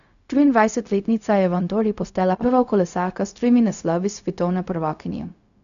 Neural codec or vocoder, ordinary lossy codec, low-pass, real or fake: codec, 16 kHz, 0.4 kbps, LongCat-Audio-Codec; none; 7.2 kHz; fake